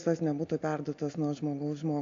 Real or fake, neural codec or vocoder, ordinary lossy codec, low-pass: real; none; AAC, 48 kbps; 7.2 kHz